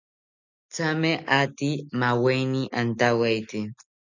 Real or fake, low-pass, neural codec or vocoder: real; 7.2 kHz; none